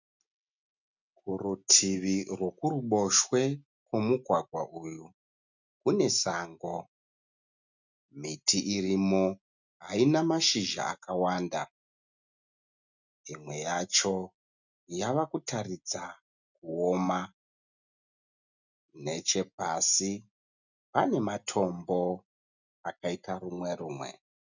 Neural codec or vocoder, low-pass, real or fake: none; 7.2 kHz; real